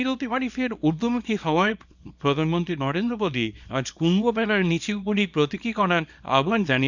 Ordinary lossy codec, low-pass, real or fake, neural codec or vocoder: none; 7.2 kHz; fake; codec, 24 kHz, 0.9 kbps, WavTokenizer, small release